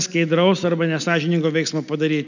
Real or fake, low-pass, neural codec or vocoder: real; 7.2 kHz; none